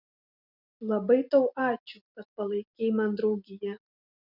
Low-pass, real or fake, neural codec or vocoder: 5.4 kHz; real; none